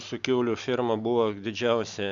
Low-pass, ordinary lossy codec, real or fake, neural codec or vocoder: 7.2 kHz; Opus, 64 kbps; real; none